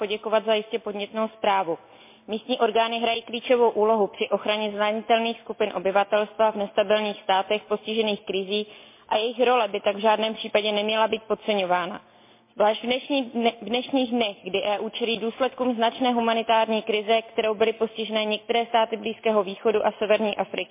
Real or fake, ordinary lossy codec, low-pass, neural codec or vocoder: real; MP3, 24 kbps; 3.6 kHz; none